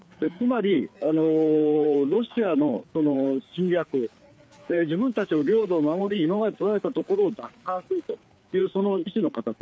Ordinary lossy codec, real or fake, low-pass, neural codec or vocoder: none; fake; none; codec, 16 kHz, 4 kbps, FreqCodec, larger model